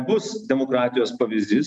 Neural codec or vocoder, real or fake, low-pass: none; real; 9.9 kHz